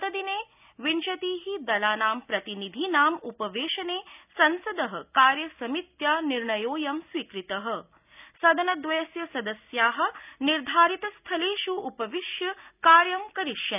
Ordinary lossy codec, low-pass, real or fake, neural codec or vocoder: none; 3.6 kHz; real; none